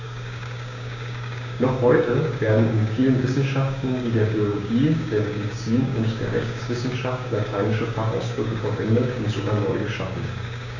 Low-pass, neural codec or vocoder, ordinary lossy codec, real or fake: 7.2 kHz; codec, 16 kHz, 6 kbps, DAC; none; fake